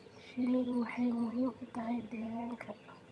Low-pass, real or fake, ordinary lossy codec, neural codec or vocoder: none; fake; none; vocoder, 22.05 kHz, 80 mel bands, HiFi-GAN